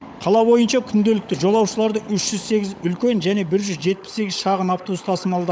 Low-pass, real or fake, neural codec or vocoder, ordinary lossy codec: none; fake; codec, 16 kHz, 16 kbps, FunCodec, trained on LibriTTS, 50 frames a second; none